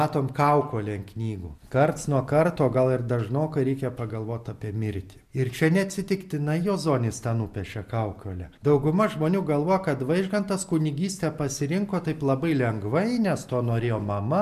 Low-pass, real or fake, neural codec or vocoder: 14.4 kHz; real; none